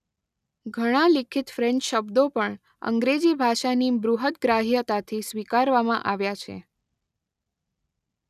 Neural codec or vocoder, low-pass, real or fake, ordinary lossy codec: none; 14.4 kHz; real; none